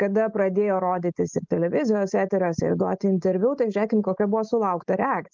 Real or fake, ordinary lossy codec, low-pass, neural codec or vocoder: real; Opus, 24 kbps; 7.2 kHz; none